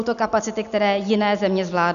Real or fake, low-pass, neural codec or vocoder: real; 7.2 kHz; none